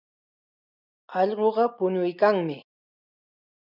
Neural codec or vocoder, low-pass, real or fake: none; 5.4 kHz; real